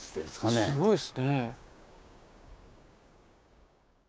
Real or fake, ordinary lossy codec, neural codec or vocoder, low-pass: fake; none; codec, 16 kHz, 6 kbps, DAC; none